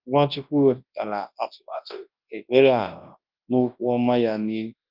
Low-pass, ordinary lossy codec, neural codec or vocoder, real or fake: 5.4 kHz; Opus, 32 kbps; codec, 24 kHz, 0.9 kbps, WavTokenizer, large speech release; fake